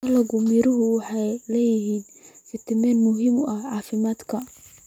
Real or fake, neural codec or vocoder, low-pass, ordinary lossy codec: real; none; 19.8 kHz; none